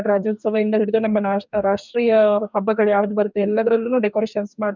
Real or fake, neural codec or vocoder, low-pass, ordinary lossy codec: fake; codec, 44.1 kHz, 2.6 kbps, DAC; 7.2 kHz; none